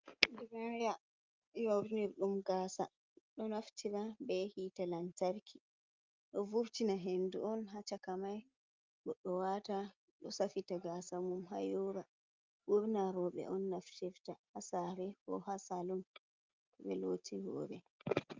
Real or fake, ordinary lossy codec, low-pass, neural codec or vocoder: real; Opus, 24 kbps; 7.2 kHz; none